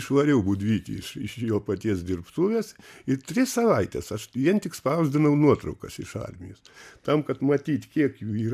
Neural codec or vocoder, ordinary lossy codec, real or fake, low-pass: none; MP3, 96 kbps; real; 14.4 kHz